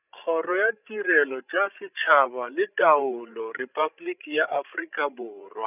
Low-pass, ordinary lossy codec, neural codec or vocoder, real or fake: 3.6 kHz; none; codec, 16 kHz, 16 kbps, FreqCodec, larger model; fake